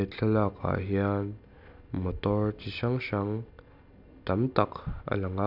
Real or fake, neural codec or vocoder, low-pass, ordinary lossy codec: real; none; 5.4 kHz; none